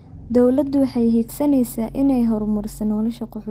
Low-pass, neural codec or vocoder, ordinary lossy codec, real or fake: 14.4 kHz; none; Opus, 16 kbps; real